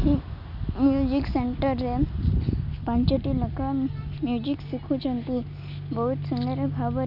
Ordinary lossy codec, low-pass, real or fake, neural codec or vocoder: none; 5.4 kHz; real; none